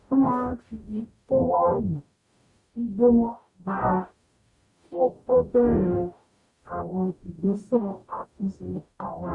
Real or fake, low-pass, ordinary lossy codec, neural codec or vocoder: fake; 10.8 kHz; Opus, 64 kbps; codec, 44.1 kHz, 0.9 kbps, DAC